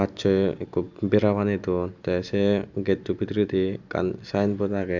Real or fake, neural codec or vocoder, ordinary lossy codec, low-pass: real; none; none; 7.2 kHz